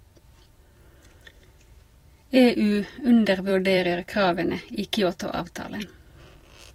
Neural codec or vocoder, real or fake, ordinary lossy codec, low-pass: vocoder, 44.1 kHz, 128 mel bands every 256 samples, BigVGAN v2; fake; AAC, 48 kbps; 19.8 kHz